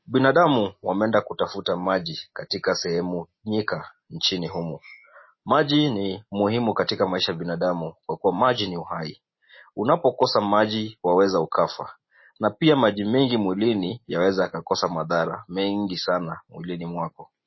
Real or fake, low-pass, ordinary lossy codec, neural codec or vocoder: real; 7.2 kHz; MP3, 24 kbps; none